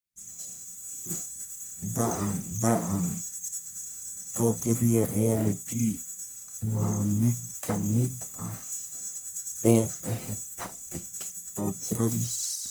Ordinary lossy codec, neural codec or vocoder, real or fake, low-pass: none; codec, 44.1 kHz, 1.7 kbps, Pupu-Codec; fake; none